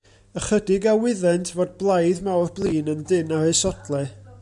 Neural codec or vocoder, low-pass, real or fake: none; 10.8 kHz; real